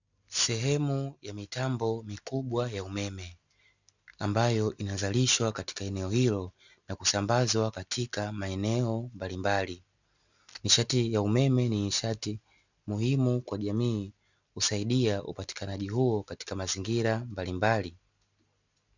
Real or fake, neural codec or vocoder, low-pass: real; none; 7.2 kHz